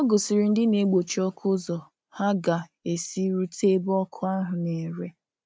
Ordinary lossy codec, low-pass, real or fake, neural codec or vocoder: none; none; real; none